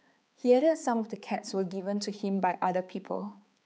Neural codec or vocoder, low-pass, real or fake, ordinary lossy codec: codec, 16 kHz, 4 kbps, X-Codec, HuBERT features, trained on balanced general audio; none; fake; none